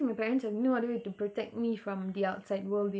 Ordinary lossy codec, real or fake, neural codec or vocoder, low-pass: none; real; none; none